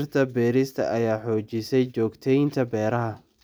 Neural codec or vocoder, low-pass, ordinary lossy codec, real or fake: none; none; none; real